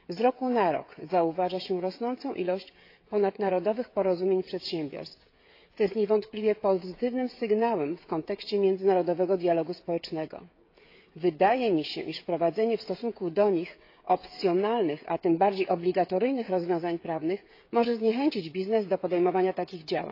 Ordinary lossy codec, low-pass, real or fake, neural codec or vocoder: AAC, 32 kbps; 5.4 kHz; fake; codec, 16 kHz, 16 kbps, FreqCodec, smaller model